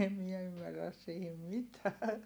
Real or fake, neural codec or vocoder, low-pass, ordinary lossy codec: real; none; none; none